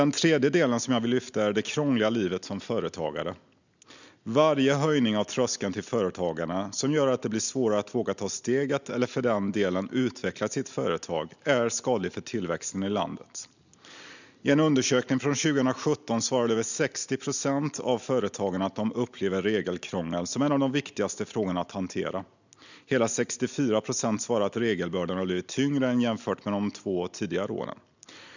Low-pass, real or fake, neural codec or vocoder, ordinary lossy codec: 7.2 kHz; real; none; none